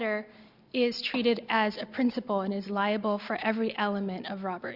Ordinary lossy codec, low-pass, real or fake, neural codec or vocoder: Opus, 64 kbps; 5.4 kHz; real; none